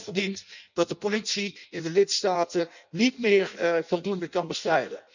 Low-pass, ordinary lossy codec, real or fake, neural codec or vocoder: 7.2 kHz; none; fake; codec, 16 kHz in and 24 kHz out, 0.6 kbps, FireRedTTS-2 codec